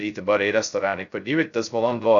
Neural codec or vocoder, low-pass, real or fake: codec, 16 kHz, 0.2 kbps, FocalCodec; 7.2 kHz; fake